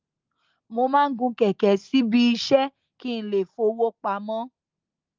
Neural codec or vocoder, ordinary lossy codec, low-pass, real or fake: none; Opus, 24 kbps; 7.2 kHz; real